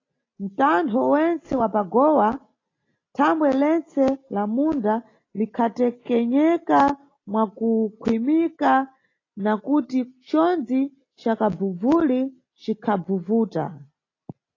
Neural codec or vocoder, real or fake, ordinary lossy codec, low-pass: none; real; AAC, 32 kbps; 7.2 kHz